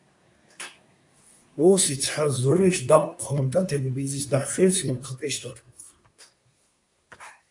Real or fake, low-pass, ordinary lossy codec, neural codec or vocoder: fake; 10.8 kHz; AAC, 64 kbps; codec, 24 kHz, 1 kbps, SNAC